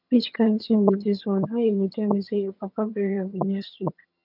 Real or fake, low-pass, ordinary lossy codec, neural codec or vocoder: fake; 5.4 kHz; none; vocoder, 22.05 kHz, 80 mel bands, HiFi-GAN